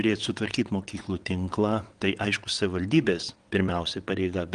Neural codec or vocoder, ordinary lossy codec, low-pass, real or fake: vocoder, 22.05 kHz, 80 mel bands, WaveNeXt; Opus, 24 kbps; 9.9 kHz; fake